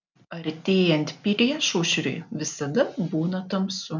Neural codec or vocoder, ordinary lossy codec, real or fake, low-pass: none; MP3, 64 kbps; real; 7.2 kHz